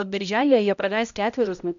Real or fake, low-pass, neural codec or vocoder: fake; 7.2 kHz; codec, 16 kHz, 0.5 kbps, X-Codec, HuBERT features, trained on balanced general audio